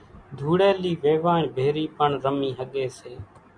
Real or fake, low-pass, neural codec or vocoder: real; 9.9 kHz; none